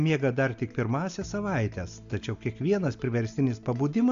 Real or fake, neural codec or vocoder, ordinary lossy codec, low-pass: real; none; AAC, 64 kbps; 7.2 kHz